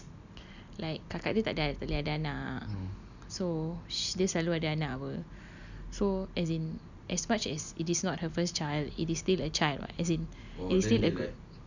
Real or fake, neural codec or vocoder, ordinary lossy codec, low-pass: real; none; none; 7.2 kHz